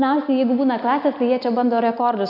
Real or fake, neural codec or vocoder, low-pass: real; none; 5.4 kHz